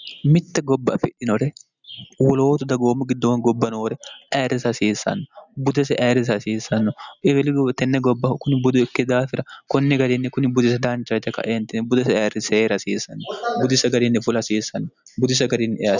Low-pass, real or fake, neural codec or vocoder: 7.2 kHz; real; none